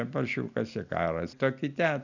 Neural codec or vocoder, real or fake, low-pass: none; real; 7.2 kHz